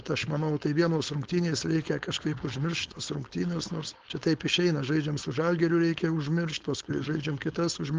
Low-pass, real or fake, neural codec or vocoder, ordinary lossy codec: 7.2 kHz; fake; codec, 16 kHz, 4.8 kbps, FACodec; Opus, 16 kbps